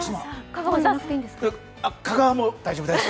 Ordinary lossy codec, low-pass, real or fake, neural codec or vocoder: none; none; real; none